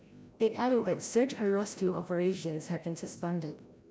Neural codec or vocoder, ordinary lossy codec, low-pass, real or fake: codec, 16 kHz, 0.5 kbps, FreqCodec, larger model; none; none; fake